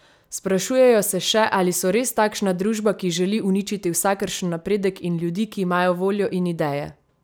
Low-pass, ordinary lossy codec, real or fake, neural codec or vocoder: none; none; real; none